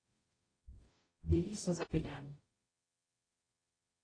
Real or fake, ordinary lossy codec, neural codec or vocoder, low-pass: fake; AAC, 32 kbps; codec, 44.1 kHz, 0.9 kbps, DAC; 9.9 kHz